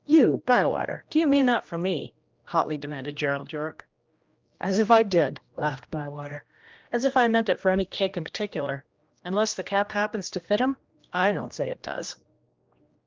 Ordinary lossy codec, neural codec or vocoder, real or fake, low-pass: Opus, 24 kbps; codec, 16 kHz, 1 kbps, X-Codec, HuBERT features, trained on general audio; fake; 7.2 kHz